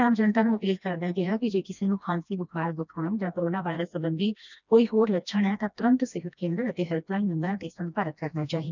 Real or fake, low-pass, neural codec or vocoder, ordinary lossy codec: fake; 7.2 kHz; codec, 16 kHz, 1 kbps, FreqCodec, smaller model; none